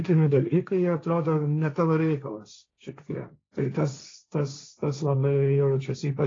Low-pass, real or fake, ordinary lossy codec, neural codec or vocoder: 7.2 kHz; fake; AAC, 32 kbps; codec, 16 kHz, 1.1 kbps, Voila-Tokenizer